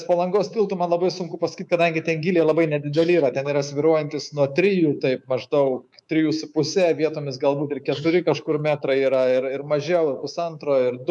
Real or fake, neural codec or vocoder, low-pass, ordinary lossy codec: fake; codec, 24 kHz, 3.1 kbps, DualCodec; 10.8 kHz; MP3, 96 kbps